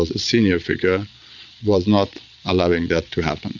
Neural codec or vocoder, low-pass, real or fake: vocoder, 22.05 kHz, 80 mel bands, Vocos; 7.2 kHz; fake